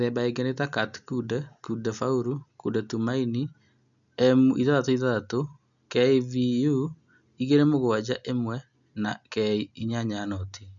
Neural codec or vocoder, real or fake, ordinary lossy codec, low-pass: none; real; none; 7.2 kHz